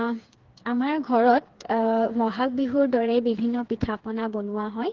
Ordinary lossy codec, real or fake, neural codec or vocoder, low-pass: Opus, 16 kbps; fake; codec, 16 kHz, 4 kbps, FreqCodec, smaller model; 7.2 kHz